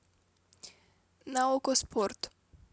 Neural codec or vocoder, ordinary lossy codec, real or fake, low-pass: none; none; real; none